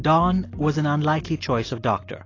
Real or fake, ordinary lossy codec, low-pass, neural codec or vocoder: real; AAC, 32 kbps; 7.2 kHz; none